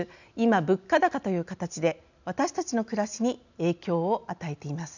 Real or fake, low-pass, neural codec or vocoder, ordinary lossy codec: real; 7.2 kHz; none; none